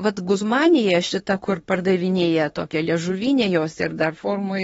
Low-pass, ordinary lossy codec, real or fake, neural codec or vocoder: 19.8 kHz; AAC, 24 kbps; fake; autoencoder, 48 kHz, 32 numbers a frame, DAC-VAE, trained on Japanese speech